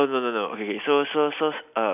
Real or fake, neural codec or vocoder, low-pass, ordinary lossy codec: real; none; 3.6 kHz; none